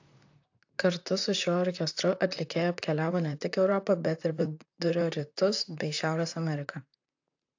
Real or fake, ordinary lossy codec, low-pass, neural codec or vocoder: fake; AAC, 48 kbps; 7.2 kHz; vocoder, 44.1 kHz, 128 mel bands, Pupu-Vocoder